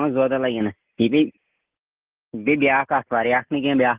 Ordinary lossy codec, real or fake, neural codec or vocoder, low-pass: Opus, 16 kbps; real; none; 3.6 kHz